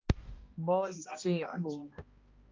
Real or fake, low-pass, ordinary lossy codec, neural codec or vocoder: fake; 7.2 kHz; Opus, 24 kbps; codec, 16 kHz, 1 kbps, X-Codec, HuBERT features, trained on balanced general audio